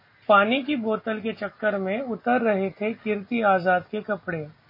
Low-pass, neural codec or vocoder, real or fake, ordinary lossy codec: 5.4 kHz; none; real; MP3, 24 kbps